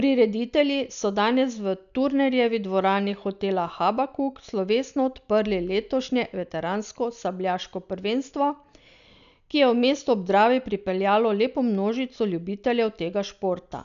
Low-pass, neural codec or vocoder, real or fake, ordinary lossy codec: 7.2 kHz; none; real; none